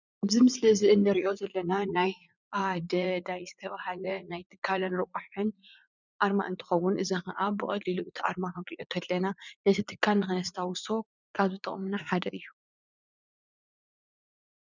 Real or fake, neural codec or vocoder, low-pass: fake; vocoder, 44.1 kHz, 80 mel bands, Vocos; 7.2 kHz